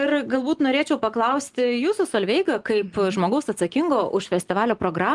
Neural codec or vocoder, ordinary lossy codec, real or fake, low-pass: none; Opus, 24 kbps; real; 10.8 kHz